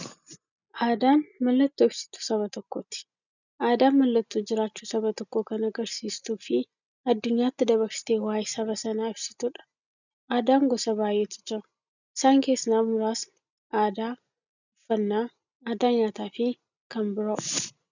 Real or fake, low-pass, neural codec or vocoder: real; 7.2 kHz; none